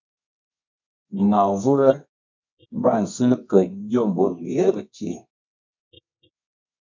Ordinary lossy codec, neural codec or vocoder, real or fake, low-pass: MP3, 64 kbps; codec, 24 kHz, 0.9 kbps, WavTokenizer, medium music audio release; fake; 7.2 kHz